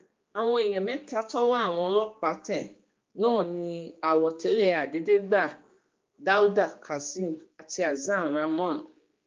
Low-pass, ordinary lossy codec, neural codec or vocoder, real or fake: 7.2 kHz; Opus, 32 kbps; codec, 16 kHz, 2 kbps, X-Codec, HuBERT features, trained on general audio; fake